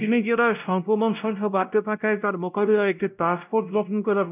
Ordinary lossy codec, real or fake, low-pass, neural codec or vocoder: none; fake; 3.6 kHz; codec, 16 kHz, 0.5 kbps, X-Codec, WavLM features, trained on Multilingual LibriSpeech